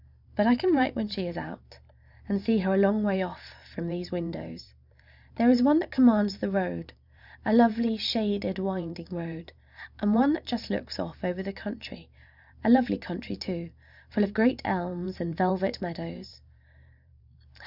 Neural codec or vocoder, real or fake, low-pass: vocoder, 44.1 kHz, 128 mel bands every 512 samples, BigVGAN v2; fake; 5.4 kHz